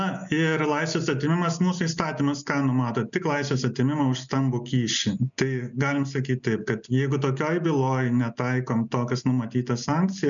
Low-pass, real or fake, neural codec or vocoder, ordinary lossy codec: 7.2 kHz; real; none; MP3, 96 kbps